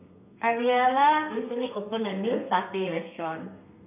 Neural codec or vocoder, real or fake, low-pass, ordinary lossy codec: codec, 32 kHz, 1.9 kbps, SNAC; fake; 3.6 kHz; none